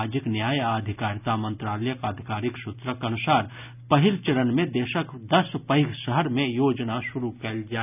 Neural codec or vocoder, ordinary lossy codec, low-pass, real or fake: none; none; 3.6 kHz; real